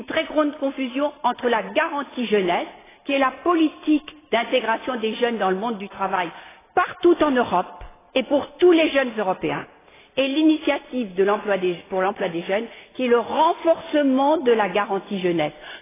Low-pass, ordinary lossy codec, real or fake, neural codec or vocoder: 3.6 kHz; AAC, 16 kbps; real; none